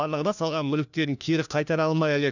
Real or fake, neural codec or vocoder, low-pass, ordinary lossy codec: fake; codec, 16 kHz, 1 kbps, FunCodec, trained on LibriTTS, 50 frames a second; 7.2 kHz; none